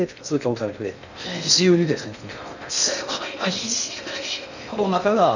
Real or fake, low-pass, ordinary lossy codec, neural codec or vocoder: fake; 7.2 kHz; none; codec, 16 kHz in and 24 kHz out, 0.6 kbps, FocalCodec, streaming, 2048 codes